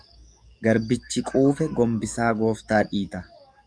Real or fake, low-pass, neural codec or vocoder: fake; 9.9 kHz; codec, 44.1 kHz, 7.8 kbps, DAC